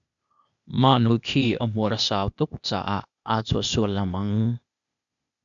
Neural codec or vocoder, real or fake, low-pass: codec, 16 kHz, 0.8 kbps, ZipCodec; fake; 7.2 kHz